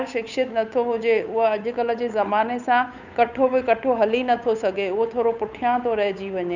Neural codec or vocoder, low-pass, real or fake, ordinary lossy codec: codec, 16 kHz, 8 kbps, FunCodec, trained on Chinese and English, 25 frames a second; 7.2 kHz; fake; none